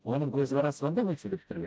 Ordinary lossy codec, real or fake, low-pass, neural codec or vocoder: none; fake; none; codec, 16 kHz, 0.5 kbps, FreqCodec, smaller model